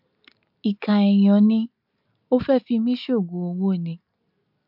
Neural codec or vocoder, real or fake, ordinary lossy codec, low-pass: none; real; MP3, 48 kbps; 5.4 kHz